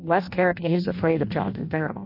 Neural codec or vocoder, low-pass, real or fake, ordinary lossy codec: codec, 16 kHz in and 24 kHz out, 0.6 kbps, FireRedTTS-2 codec; 5.4 kHz; fake; MP3, 32 kbps